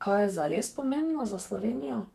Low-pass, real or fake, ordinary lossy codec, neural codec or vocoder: 14.4 kHz; fake; none; codec, 32 kHz, 1.9 kbps, SNAC